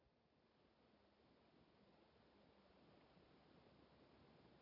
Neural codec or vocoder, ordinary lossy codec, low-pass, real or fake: none; none; 5.4 kHz; real